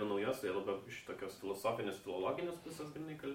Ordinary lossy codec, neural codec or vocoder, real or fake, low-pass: MP3, 64 kbps; none; real; 19.8 kHz